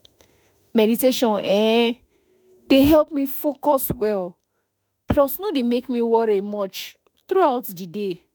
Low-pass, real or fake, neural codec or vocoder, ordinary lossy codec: none; fake; autoencoder, 48 kHz, 32 numbers a frame, DAC-VAE, trained on Japanese speech; none